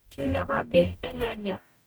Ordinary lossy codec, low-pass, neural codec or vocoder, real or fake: none; none; codec, 44.1 kHz, 0.9 kbps, DAC; fake